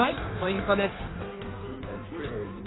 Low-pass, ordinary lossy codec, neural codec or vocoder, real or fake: 7.2 kHz; AAC, 16 kbps; codec, 16 kHz in and 24 kHz out, 1.1 kbps, FireRedTTS-2 codec; fake